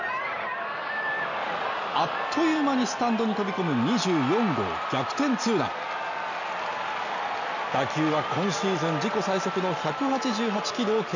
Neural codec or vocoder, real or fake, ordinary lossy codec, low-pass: none; real; none; 7.2 kHz